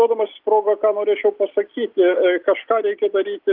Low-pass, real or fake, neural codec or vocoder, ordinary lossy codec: 5.4 kHz; real; none; Opus, 32 kbps